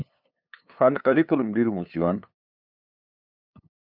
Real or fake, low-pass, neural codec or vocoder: fake; 5.4 kHz; codec, 16 kHz, 2 kbps, FunCodec, trained on LibriTTS, 25 frames a second